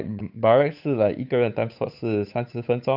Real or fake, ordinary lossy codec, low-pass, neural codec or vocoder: fake; none; 5.4 kHz; codec, 16 kHz, 8 kbps, FunCodec, trained on Chinese and English, 25 frames a second